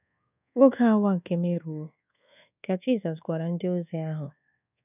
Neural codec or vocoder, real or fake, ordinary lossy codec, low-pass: codec, 24 kHz, 1.2 kbps, DualCodec; fake; none; 3.6 kHz